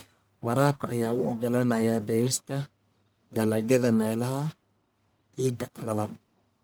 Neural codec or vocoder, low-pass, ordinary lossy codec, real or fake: codec, 44.1 kHz, 1.7 kbps, Pupu-Codec; none; none; fake